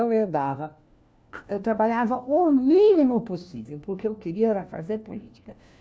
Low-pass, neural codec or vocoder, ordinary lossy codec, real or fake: none; codec, 16 kHz, 1 kbps, FunCodec, trained on LibriTTS, 50 frames a second; none; fake